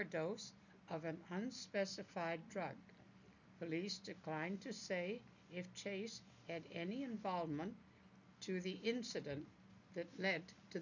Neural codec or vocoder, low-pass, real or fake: none; 7.2 kHz; real